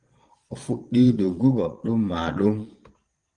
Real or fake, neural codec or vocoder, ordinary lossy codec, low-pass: fake; vocoder, 22.05 kHz, 80 mel bands, Vocos; Opus, 24 kbps; 9.9 kHz